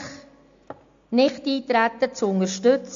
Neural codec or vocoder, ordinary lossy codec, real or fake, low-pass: none; AAC, 64 kbps; real; 7.2 kHz